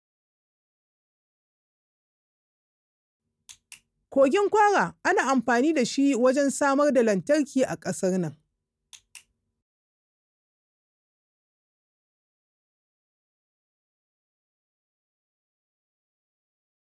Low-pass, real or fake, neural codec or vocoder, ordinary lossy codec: none; real; none; none